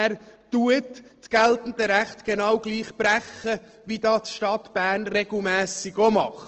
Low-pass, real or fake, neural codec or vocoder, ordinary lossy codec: 7.2 kHz; real; none; Opus, 16 kbps